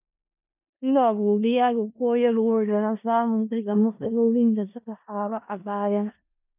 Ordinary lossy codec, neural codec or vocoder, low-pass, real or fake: none; codec, 16 kHz in and 24 kHz out, 0.4 kbps, LongCat-Audio-Codec, four codebook decoder; 3.6 kHz; fake